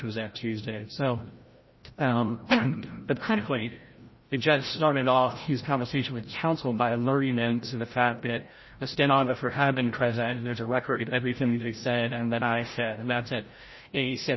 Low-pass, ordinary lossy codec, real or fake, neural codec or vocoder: 7.2 kHz; MP3, 24 kbps; fake; codec, 16 kHz, 0.5 kbps, FreqCodec, larger model